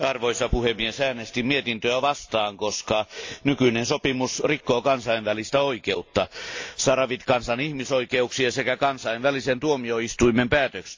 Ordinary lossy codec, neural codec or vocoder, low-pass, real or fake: AAC, 48 kbps; none; 7.2 kHz; real